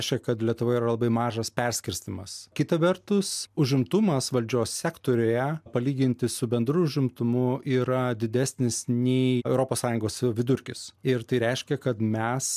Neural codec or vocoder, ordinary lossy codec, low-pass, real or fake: none; MP3, 96 kbps; 14.4 kHz; real